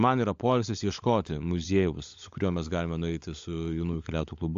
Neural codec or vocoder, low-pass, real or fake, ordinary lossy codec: codec, 16 kHz, 16 kbps, FunCodec, trained on LibriTTS, 50 frames a second; 7.2 kHz; fake; AAC, 64 kbps